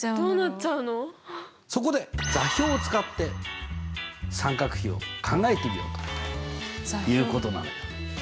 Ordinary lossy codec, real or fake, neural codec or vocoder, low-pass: none; real; none; none